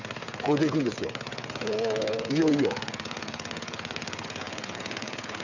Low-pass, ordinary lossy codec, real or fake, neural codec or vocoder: 7.2 kHz; none; fake; codec, 16 kHz, 8 kbps, FreqCodec, smaller model